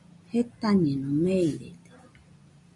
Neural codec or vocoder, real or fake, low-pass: none; real; 10.8 kHz